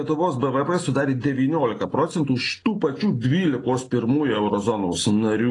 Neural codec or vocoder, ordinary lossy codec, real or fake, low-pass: none; AAC, 32 kbps; real; 10.8 kHz